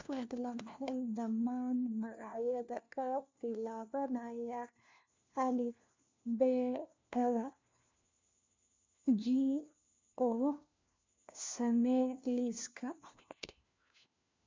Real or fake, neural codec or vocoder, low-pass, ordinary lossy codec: fake; codec, 16 kHz, 1 kbps, FunCodec, trained on LibriTTS, 50 frames a second; 7.2 kHz; MP3, 64 kbps